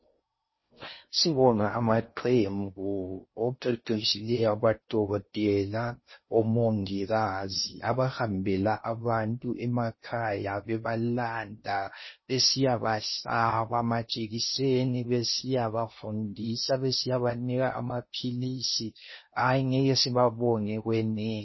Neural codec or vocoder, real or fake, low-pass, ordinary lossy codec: codec, 16 kHz in and 24 kHz out, 0.6 kbps, FocalCodec, streaming, 4096 codes; fake; 7.2 kHz; MP3, 24 kbps